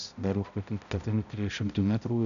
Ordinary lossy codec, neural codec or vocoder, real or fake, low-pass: MP3, 96 kbps; codec, 16 kHz, 0.5 kbps, X-Codec, HuBERT features, trained on balanced general audio; fake; 7.2 kHz